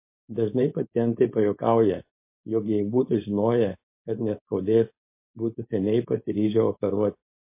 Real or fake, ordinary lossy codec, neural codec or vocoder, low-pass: fake; MP3, 24 kbps; codec, 16 kHz, 4.8 kbps, FACodec; 3.6 kHz